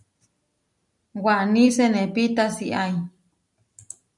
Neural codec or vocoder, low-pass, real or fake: none; 10.8 kHz; real